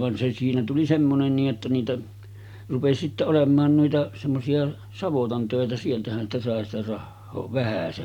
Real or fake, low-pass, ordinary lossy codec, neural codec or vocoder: real; 19.8 kHz; none; none